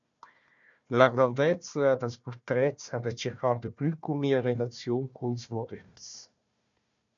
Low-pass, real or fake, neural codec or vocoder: 7.2 kHz; fake; codec, 16 kHz, 1 kbps, FunCodec, trained on Chinese and English, 50 frames a second